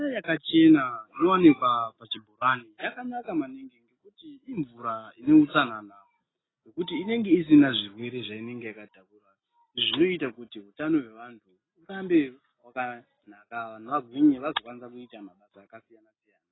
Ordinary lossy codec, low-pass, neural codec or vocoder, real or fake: AAC, 16 kbps; 7.2 kHz; none; real